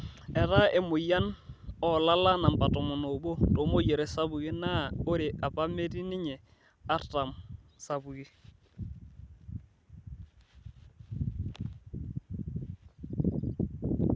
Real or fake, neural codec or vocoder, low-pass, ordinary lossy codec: real; none; none; none